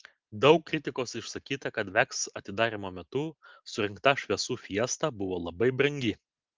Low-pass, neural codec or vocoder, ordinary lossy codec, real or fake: 7.2 kHz; none; Opus, 32 kbps; real